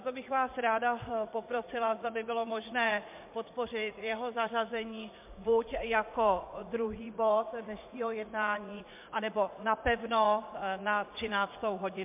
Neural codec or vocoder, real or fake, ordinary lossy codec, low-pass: vocoder, 44.1 kHz, 80 mel bands, Vocos; fake; MP3, 32 kbps; 3.6 kHz